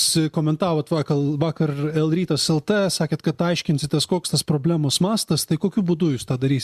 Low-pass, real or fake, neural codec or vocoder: 14.4 kHz; real; none